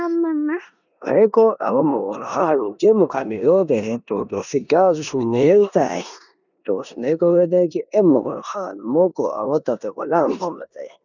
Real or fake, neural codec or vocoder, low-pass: fake; codec, 16 kHz in and 24 kHz out, 0.9 kbps, LongCat-Audio-Codec, four codebook decoder; 7.2 kHz